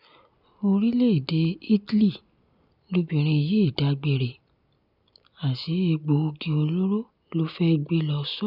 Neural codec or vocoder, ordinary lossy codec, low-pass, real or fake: none; none; 5.4 kHz; real